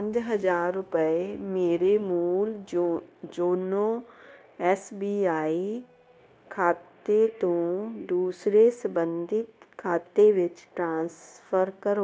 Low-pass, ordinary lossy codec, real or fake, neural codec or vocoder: none; none; fake; codec, 16 kHz, 0.9 kbps, LongCat-Audio-Codec